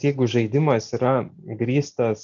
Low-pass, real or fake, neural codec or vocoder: 7.2 kHz; real; none